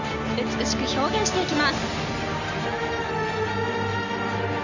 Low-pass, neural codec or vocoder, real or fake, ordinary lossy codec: 7.2 kHz; none; real; none